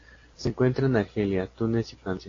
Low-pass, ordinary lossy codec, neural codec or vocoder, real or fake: 7.2 kHz; AAC, 32 kbps; none; real